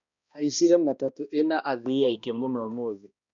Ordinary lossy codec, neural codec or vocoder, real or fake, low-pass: none; codec, 16 kHz, 1 kbps, X-Codec, HuBERT features, trained on balanced general audio; fake; 7.2 kHz